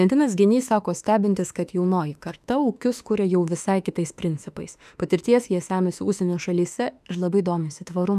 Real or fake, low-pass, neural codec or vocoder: fake; 14.4 kHz; autoencoder, 48 kHz, 32 numbers a frame, DAC-VAE, trained on Japanese speech